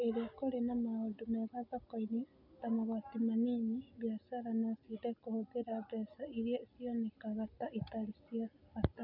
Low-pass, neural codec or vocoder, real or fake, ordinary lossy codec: 5.4 kHz; none; real; none